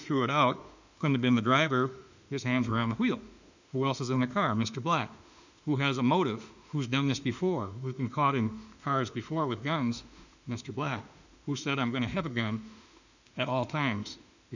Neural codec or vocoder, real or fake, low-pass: autoencoder, 48 kHz, 32 numbers a frame, DAC-VAE, trained on Japanese speech; fake; 7.2 kHz